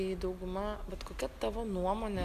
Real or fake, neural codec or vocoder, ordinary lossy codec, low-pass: real; none; AAC, 96 kbps; 14.4 kHz